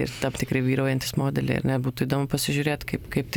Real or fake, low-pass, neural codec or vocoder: fake; 19.8 kHz; vocoder, 44.1 kHz, 128 mel bands every 256 samples, BigVGAN v2